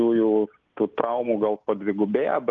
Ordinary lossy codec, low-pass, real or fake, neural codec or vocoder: Opus, 32 kbps; 7.2 kHz; real; none